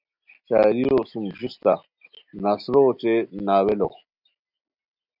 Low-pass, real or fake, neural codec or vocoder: 5.4 kHz; real; none